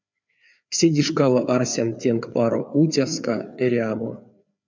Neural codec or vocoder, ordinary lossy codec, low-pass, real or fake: codec, 16 kHz, 4 kbps, FreqCodec, larger model; MP3, 64 kbps; 7.2 kHz; fake